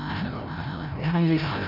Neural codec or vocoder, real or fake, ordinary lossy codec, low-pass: codec, 16 kHz, 0.5 kbps, FreqCodec, larger model; fake; none; 5.4 kHz